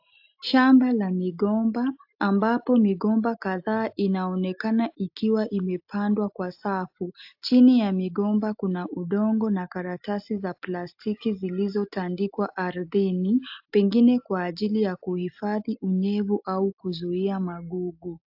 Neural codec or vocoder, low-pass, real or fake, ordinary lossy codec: none; 5.4 kHz; real; AAC, 48 kbps